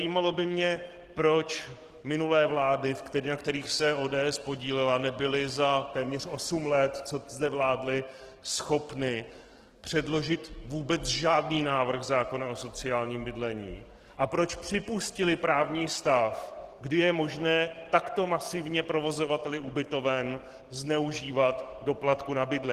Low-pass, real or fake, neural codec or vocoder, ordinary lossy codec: 14.4 kHz; fake; codec, 44.1 kHz, 7.8 kbps, DAC; Opus, 16 kbps